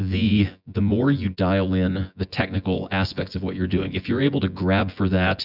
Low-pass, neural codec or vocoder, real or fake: 5.4 kHz; vocoder, 24 kHz, 100 mel bands, Vocos; fake